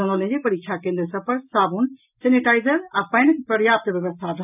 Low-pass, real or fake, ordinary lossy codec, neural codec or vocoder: 3.6 kHz; real; none; none